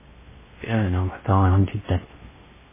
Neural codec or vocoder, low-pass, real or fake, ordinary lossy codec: codec, 16 kHz in and 24 kHz out, 0.6 kbps, FocalCodec, streaming, 4096 codes; 3.6 kHz; fake; MP3, 16 kbps